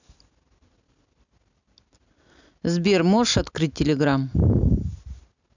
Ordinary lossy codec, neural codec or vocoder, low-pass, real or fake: none; none; 7.2 kHz; real